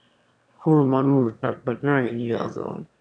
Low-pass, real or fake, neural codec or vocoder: 9.9 kHz; fake; autoencoder, 22.05 kHz, a latent of 192 numbers a frame, VITS, trained on one speaker